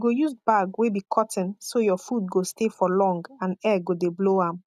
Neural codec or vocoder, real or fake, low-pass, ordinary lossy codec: none; real; 14.4 kHz; none